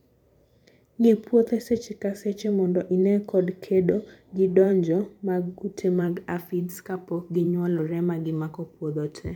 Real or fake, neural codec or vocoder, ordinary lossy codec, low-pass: fake; vocoder, 44.1 kHz, 128 mel bands every 512 samples, BigVGAN v2; none; 19.8 kHz